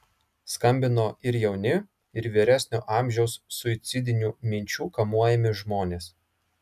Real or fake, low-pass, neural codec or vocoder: real; 14.4 kHz; none